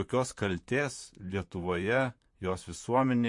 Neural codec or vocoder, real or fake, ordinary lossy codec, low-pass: vocoder, 44.1 kHz, 128 mel bands, Pupu-Vocoder; fake; MP3, 48 kbps; 10.8 kHz